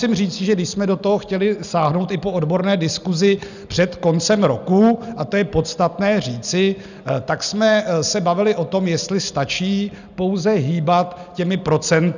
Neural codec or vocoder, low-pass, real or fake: none; 7.2 kHz; real